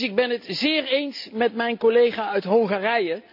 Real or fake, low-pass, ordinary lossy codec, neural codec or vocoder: real; 5.4 kHz; none; none